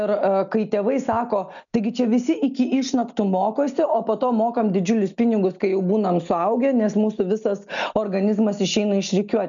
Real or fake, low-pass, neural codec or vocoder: real; 7.2 kHz; none